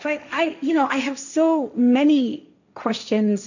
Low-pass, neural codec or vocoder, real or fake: 7.2 kHz; codec, 16 kHz, 1.1 kbps, Voila-Tokenizer; fake